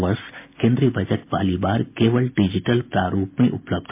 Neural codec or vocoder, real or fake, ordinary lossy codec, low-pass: none; real; MP3, 32 kbps; 3.6 kHz